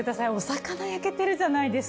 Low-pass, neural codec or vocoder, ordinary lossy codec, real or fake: none; none; none; real